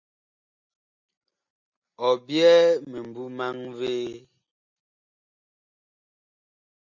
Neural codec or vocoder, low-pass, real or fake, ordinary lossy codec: none; 7.2 kHz; real; MP3, 64 kbps